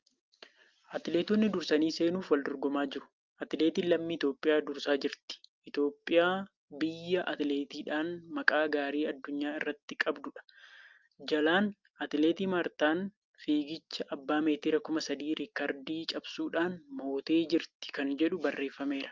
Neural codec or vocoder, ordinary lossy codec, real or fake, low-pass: none; Opus, 24 kbps; real; 7.2 kHz